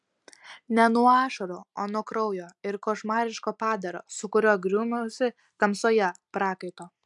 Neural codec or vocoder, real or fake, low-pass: none; real; 10.8 kHz